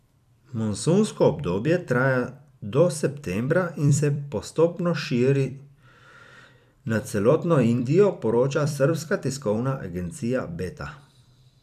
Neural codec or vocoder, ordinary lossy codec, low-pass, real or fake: vocoder, 44.1 kHz, 128 mel bands every 256 samples, BigVGAN v2; none; 14.4 kHz; fake